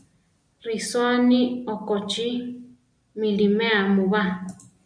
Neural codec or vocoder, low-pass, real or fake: none; 9.9 kHz; real